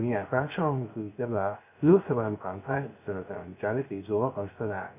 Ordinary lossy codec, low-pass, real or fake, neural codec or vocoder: none; 3.6 kHz; fake; codec, 16 kHz, 0.3 kbps, FocalCodec